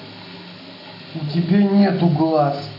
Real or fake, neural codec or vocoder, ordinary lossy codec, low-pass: real; none; AAC, 32 kbps; 5.4 kHz